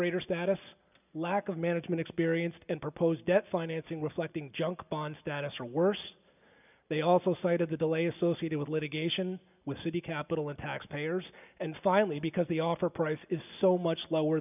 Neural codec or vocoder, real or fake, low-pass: none; real; 3.6 kHz